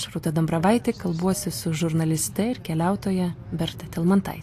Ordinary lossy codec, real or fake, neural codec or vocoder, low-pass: AAC, 64 kbps; real; none; 14.4 kHz